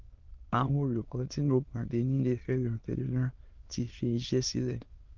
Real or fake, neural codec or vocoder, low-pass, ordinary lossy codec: fake; autoencoder, 22.05 kHz, a latent of 192 numbers a frame, VITS, trained on many speakers; 7.2 kHz; Opus, 32 kbps